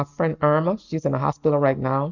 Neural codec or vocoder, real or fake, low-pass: none; real; 7.2 kHz